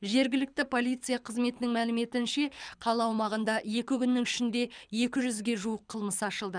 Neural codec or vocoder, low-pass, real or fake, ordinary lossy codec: codec, 24 kHz, 6 kbps, HILCodec; 9.9 kHz; fake; none